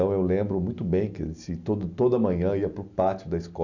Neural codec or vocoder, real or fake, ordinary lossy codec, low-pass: none; real; none; 7.2 kHz